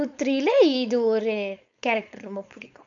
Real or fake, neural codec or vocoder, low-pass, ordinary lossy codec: fake; codec, 16 kHz, 4.8 kbps, FACodec; 7.2 kHz; AAC, 64 kbps